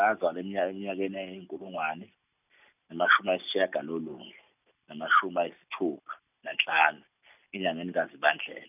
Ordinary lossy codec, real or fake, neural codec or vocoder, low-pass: none; real; none; 3.6 kHz